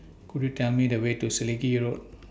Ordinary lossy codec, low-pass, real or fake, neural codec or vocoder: none; none; real; none